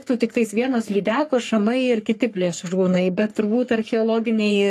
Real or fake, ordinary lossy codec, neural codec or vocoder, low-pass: fake; AAC, 64 kbps; codec, 44.1 kHz, 3.4 kbps, Pupu-Codec; 14.4 kHz